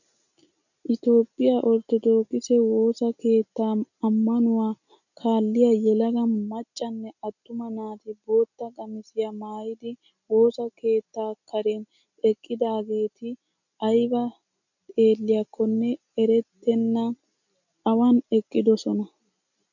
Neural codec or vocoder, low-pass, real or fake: none; 7.2 kHz; real